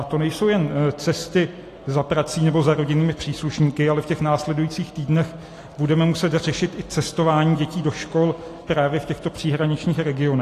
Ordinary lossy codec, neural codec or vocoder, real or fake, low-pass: AAC, 48 kbps; none; real; 14.4 kHz